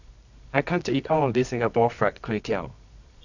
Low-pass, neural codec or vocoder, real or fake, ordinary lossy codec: 7.2 kHz; codec, 24 kHz, 0.9 kbps, WavTokenizer, medium music audio release; fake; none